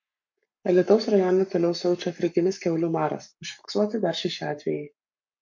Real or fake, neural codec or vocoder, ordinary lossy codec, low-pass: fake; codec, 44.1 kHz, 7.8 kbps, Pupu-Codec; MP3, 48 kbps; 7.2 kHz